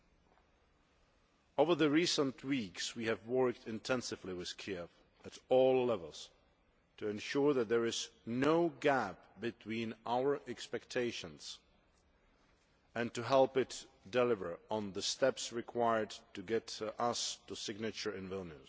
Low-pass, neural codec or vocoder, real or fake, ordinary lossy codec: none; none; real; none